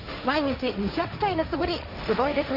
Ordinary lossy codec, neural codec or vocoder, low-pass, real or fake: none; codec, 16 kHz, 1.1 kbps, Voila-Tokenizer; 5.4 kHz; fake